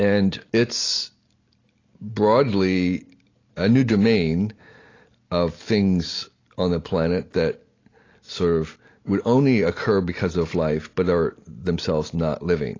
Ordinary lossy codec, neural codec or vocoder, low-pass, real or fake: AAC, 32 kbps; none; 7.2 kHz; real